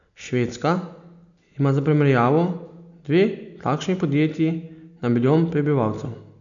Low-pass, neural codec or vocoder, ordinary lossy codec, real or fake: 7.2 kHz; none; none; real